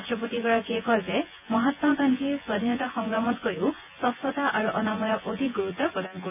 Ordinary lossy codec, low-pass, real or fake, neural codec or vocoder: none; 3.6 kHz; fake; vocoder, 24 kHz, 100 mel bands, Vocos